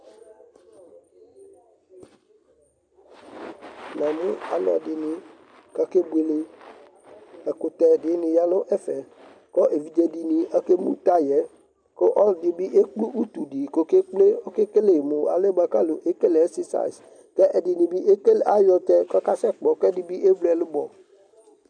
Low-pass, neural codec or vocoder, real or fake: 9.9 kHz; none; real